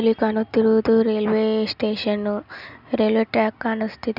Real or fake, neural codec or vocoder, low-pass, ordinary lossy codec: real; none; 5.4 kHz; none